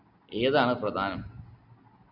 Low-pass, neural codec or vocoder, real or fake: 5.4 kHz; none; real